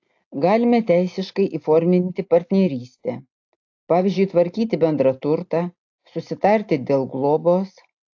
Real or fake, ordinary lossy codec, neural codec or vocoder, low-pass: real; AAC, 48 kbps; none; 7.2 kHz